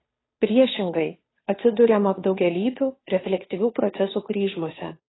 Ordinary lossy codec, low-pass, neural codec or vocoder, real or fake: AAC, 16 kbps; 7.2 kHz; codec, 16 kHz, 2 kbps, FunCodec, trained on Chinese and English, 25 frames a second; fake